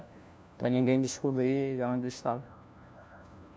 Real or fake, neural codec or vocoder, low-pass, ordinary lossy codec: fake; codec, 16 kHz, 1 kbps, FunCodec, trained on LibriTTS, 50 frames a second; none; none